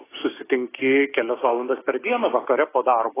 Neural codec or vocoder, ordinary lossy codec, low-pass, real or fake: codec, 16 kHz, 6 kbps, DAC; AAC, 16 kbps; 3.6 kHz; fake